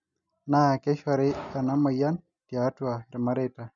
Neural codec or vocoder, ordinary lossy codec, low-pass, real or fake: none; none; 7.2 kHz; real